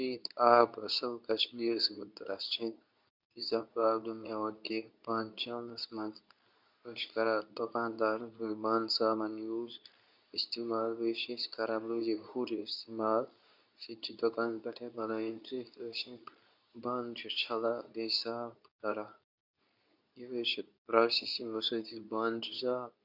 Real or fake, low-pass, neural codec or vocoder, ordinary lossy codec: fake; 5.4 kHz; codec, 24 kHz, 0.9 kbps, WavTokenizer, medium speech release version 2; none